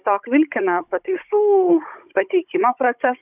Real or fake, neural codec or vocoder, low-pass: fake; codec, 16 kHz, 16 kbps, FunCodec, trained on Chinese and English, 50 frames a second; 3.6 kHz